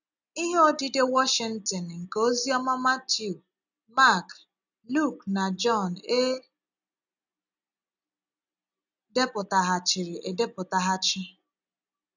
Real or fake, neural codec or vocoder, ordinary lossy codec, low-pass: real; none; none; 7.2 kHz